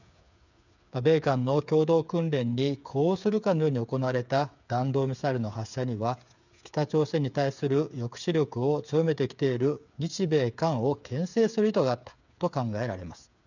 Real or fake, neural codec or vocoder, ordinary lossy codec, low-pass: fake; codec, 16 kHz, 8 kbps, FreqCodec, smaller model; none; 7.2 kHz